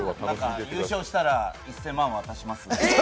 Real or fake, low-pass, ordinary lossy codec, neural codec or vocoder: real; none; none; none